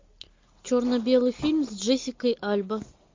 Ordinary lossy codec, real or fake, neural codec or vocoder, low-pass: MP3, 48 kbps; real; none; 7.2 kHz